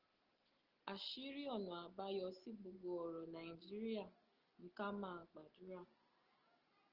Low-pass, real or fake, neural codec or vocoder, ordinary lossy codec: 5.4 kHz; real; none; Opus, 16 kbps